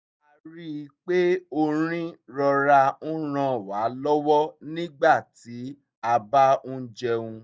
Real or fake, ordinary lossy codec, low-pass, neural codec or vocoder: real; none; none; none